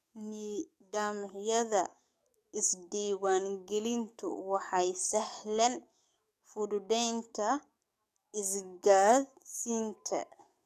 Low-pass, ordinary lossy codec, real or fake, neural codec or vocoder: 14.4 kHz; none; fake; codec, 44.1 kHz, 7.8 kbps, DAC